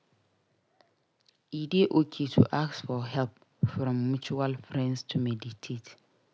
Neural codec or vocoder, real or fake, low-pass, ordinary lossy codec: none; real; none; none